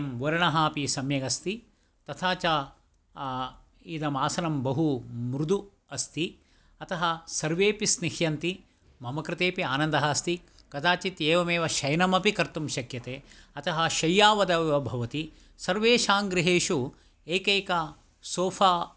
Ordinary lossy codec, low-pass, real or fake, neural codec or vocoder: none; none; real; none